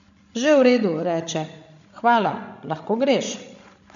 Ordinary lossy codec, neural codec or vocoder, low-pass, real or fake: none; codec, 16 kHz, 16 kbps, FreqCodec, larger model; 7.2 kHz; fake